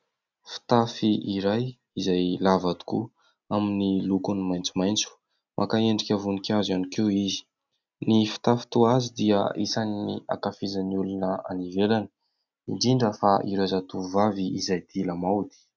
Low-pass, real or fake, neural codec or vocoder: 7.2 kHz; real; none